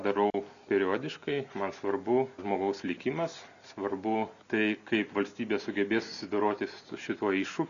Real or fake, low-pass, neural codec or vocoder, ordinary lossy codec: real; 7.2 kHz; none; MP3, 96 kbps